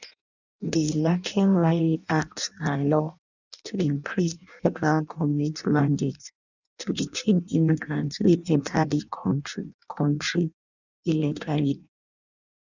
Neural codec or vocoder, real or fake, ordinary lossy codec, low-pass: codec, 16 kHz in and 24 kHz out, 0.6 kbps, FireRedTTS-2 codec; fake; none; 7.2 kHz